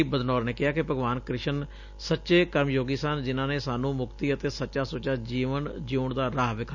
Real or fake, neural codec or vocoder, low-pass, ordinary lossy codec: real; none; 7.2 kHz; none